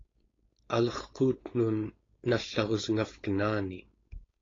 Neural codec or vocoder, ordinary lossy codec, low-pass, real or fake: codec, 16 kHz, 4.8 kbps, FACodec; AAC, 32 kbps; 7.2 kHz; fake